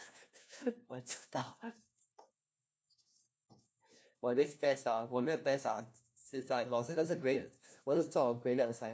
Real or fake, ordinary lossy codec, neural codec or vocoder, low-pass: fake; none; codec, 16 kHz, 1 kbps, FunCodec, trained on LibriTTS, 50 frames a second; none